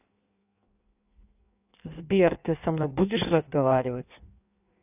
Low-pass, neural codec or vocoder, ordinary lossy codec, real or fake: 3.6 kHz; codec, 16 kHz in and 24 kHz out, 1.1 kbps, FireRedTTS-2 codec; none; fake